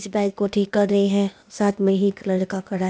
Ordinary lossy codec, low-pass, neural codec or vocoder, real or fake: none; none; codec, 16 kHz, 0.8 kbps, ZipCodec; fake